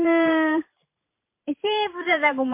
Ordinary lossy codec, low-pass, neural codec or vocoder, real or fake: MP3, 24 kbps; 3.6 kHz; vocoder, 44.1 kHz, 128 mel bands every 512 samples, BigVGAN v2; fake